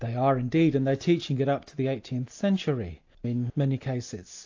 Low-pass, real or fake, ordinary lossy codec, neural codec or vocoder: 7.2 kHz; real; AAC, 48 kbps; none